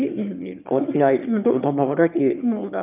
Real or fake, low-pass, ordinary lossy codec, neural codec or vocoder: fake; 3.6 kHz; none; autoencoder, 22.05 kHz, a latent of 192 numbers a frame, VITS, trained on one speaker